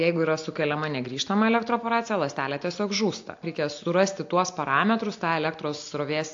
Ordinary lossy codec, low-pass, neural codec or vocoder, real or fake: AAC, 48 kbps; 7.2 kHz; none; real